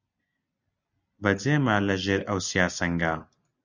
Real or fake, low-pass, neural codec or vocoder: real; 7.2 kHz; none